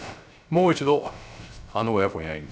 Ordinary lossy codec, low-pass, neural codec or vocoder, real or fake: none; none; codec, 16 kHz, 0.3 kbps, FocalCodec; fake